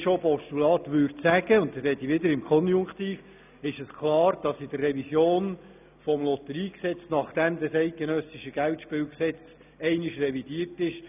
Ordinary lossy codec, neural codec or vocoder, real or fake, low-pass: none; none; real; 3.6 kHz